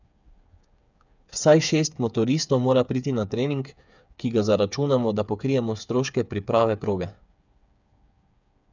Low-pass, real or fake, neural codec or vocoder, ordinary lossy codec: 7.2 kHz; fake; codec, 16 kHz, 8 kbps, FreqCodec, smaller model; none